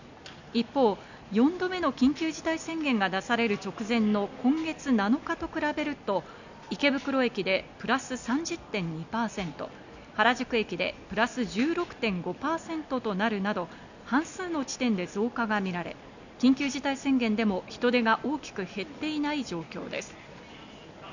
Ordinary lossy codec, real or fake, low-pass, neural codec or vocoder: none; real; 7.2 kHz; none